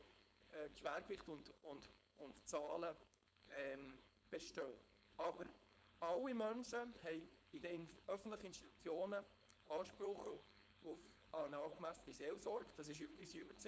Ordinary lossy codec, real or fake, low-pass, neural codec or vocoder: none; fake; none; codec, 16 kHz, 4.8 kbps, FACodec